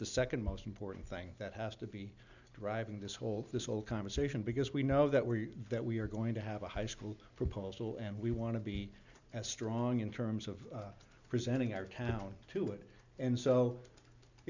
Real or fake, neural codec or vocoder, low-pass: real; none; 7.2 kHz